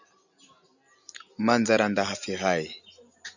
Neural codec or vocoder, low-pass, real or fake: none; 7.2 kHz; real